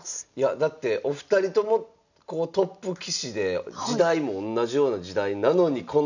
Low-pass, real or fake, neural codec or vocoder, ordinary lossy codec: 7.2 kHz; real; none; none